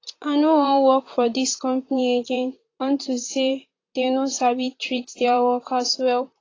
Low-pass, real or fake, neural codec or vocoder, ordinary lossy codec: 7.2 kHz; fake; vocoder, 44.1 kHz, 128 mel bands, Pupu-Vocoder; AAC, 32 kbps